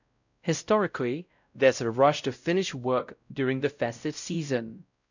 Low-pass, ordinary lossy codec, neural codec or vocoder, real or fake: 7.2 kHz; none; codec, 16 kHz, 0.5 kbps, X-Codec, WavLM features, trained on Multilingual LibriSpeech; fake